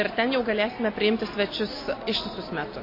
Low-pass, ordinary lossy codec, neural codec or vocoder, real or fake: 5.4 kHz; MP3, 24 kbps; none; real